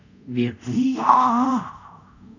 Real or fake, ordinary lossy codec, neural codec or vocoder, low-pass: fake; none; codec, 24 kHz, 0.5 kbps, DualCodec; 7.2 kHz